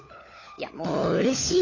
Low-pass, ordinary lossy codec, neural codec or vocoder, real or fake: 7.2 kHz; AAC, 32 kbps; codec, 16 kHz, 16 kbps, FunCodec, trained on LibriTTS, 50 frames a second; fake